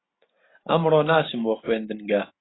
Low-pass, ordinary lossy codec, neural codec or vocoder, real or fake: 7.2 kHz; AAC, 16 kbps; none; real